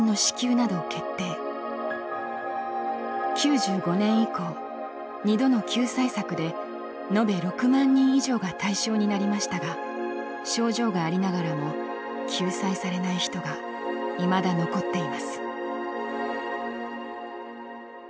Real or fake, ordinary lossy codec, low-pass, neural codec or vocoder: real; none; none; none